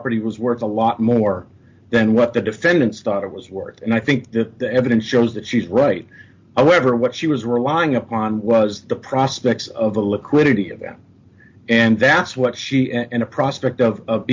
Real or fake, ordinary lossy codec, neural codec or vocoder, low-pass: real; MP3, 48 kbps; none; 7.2 kHz